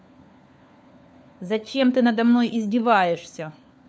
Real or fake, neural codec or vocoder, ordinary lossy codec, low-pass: fake; codec, 16 kHz, 4 kbps, FunCodec, trained on LibriTTS, 50 frames a second; none; none